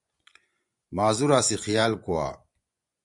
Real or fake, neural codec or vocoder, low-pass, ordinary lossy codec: fake; vocoder, 24 kHz, 100 mel bands, Vocos; 10.8 kHz; MP3, 96 kbps